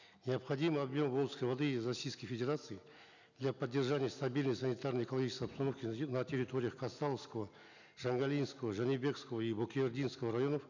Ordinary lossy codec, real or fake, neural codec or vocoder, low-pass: none; real; none; 7.2 kHz